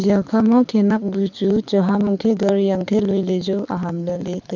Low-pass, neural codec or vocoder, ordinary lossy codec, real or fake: 7.2 kHz; codec, 24 kHz, 6 kbps, HILCodec; none; fake